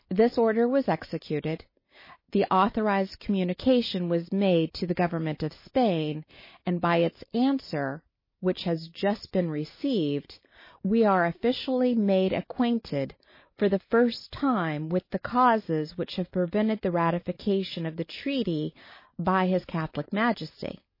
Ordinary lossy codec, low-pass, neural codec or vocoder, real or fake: MP3, 24 kbps; 5.4 kHz; none; real